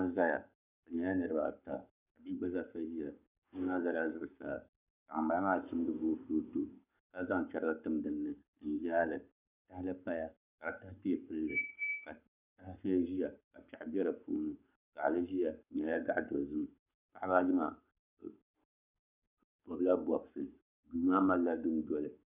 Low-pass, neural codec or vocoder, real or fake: 3.6 kHz; codec, 44.1 kHz, 7.8 kbps, Pupu-Codec; fake